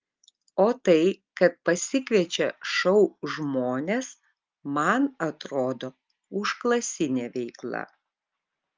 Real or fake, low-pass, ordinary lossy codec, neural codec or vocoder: real; 7.2 kHz; Opus, 24 kbps; none